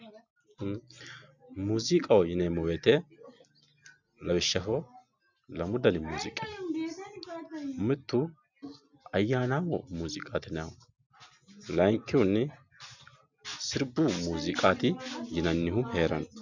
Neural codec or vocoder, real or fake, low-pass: none; real; 7.2 kHz